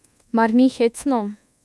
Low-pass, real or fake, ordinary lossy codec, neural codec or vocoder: none; fake; none; codec, 24 kHz, 1.2 kbps, DualCodec